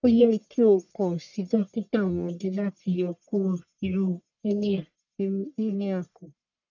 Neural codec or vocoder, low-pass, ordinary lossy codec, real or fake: codec, 44.1 kHz, 1.7 kbps, Pupu-Codec; 7.2 kHz; none; fake